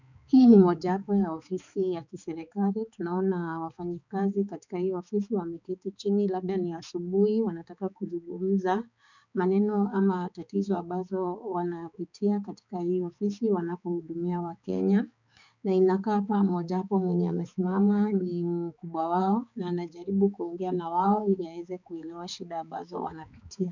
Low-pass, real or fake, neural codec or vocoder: 7.2 kHz; fake; codec, 16 kHz, 4 kbps, X-Codec, HuBERT features, trained on balanced general audio